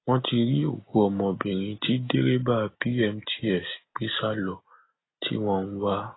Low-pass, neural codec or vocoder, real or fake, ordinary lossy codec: 7.2 kHz; none; real; AAC, 16 kbps